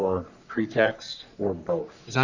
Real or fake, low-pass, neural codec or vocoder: fake; 7.2 kHz; codec, 44.1 kHz, 3.4 kbps, Pupu-Codec